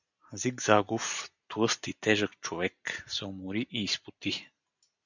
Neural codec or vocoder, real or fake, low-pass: none; real; 7.2 kHz